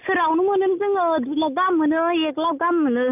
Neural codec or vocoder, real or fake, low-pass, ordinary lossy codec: none; real; 3.6 kHz; none